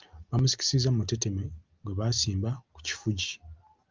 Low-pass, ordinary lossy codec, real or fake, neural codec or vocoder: 7.2 kHz; Opus, 32 kbps; real; none